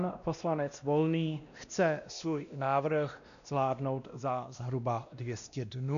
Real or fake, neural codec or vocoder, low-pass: fake; codec, 16 kHz, 1 kbps, X-Codec, WavLM features, trained on Multilingual LibriSpeech; 7.2 kHz